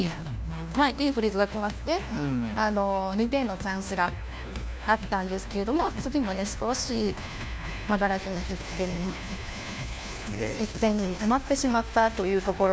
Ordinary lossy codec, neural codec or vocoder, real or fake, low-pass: none; codec, 16 kHz, 1 kbps, FunCodec, trained on LibriTTS, 50 frames a second; fake; none